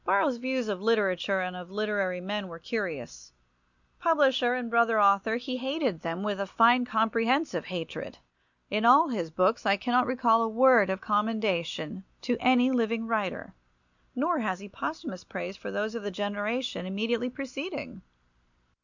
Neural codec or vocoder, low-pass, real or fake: none; 7.2 kHz; real